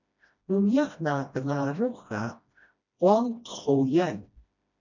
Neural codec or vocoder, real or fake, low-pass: codec, 16 kHz, 1 kbps, FreqCodec, smaller model; fake; 7.2 kHz